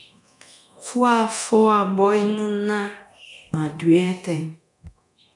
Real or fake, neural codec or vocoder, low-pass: fake; codec, 24 kHz, 0.9 kbps, DualCodec; 10.8 kHz